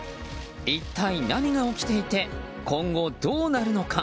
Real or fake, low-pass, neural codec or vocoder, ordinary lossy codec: real; none; none; none